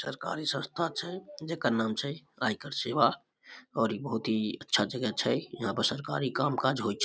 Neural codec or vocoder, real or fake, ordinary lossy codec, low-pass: none; real; none; none